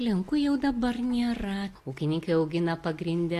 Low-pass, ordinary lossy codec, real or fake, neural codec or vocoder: 14.4 kHz; AAC, 48 kbps; real; none